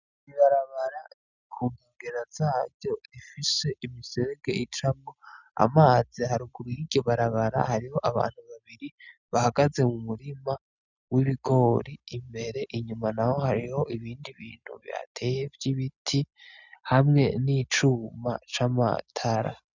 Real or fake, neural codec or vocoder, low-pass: real; none; 7.2 kHz